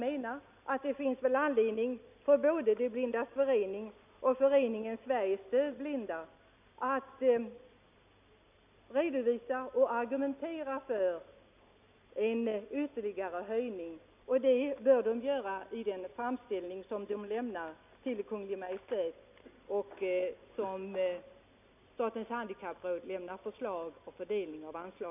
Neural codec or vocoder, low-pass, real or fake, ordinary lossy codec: none; 3.6 kHz; real; none